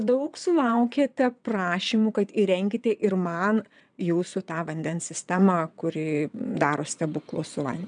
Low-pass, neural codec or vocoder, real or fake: 9.9 kHz; vocoder, 22.05 kHz, 80 mel bands, WaveNeXt; fake